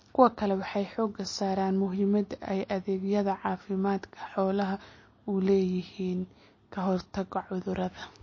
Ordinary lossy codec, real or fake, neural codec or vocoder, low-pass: MP3, 32 kbps; real; none; 7.2 kHz